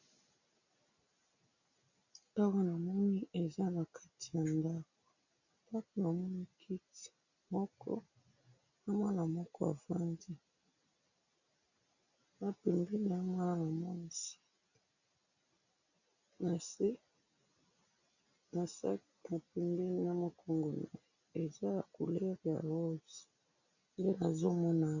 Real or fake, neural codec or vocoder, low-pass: real; none; 7.2 kHz